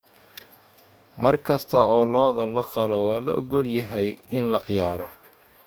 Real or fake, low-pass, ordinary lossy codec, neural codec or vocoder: fake; none; none; codec, 44.1 kHz, 2.6 kbps, DAC